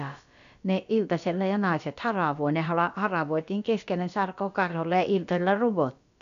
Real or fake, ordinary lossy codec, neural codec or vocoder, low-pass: fake; none; codec, 16 kHz, about 1 kbps, DyCAST, with the encoder's durations; 7.2 kHz